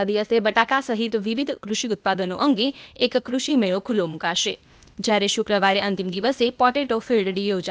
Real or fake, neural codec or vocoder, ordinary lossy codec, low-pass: fake; codec, 16 kHz, 0.8 kbps, ZipCodec; none; none